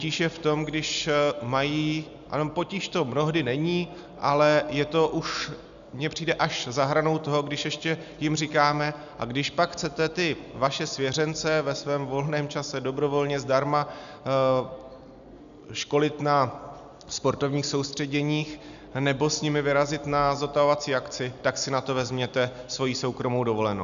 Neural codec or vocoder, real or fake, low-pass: none; real; 7.2 kHz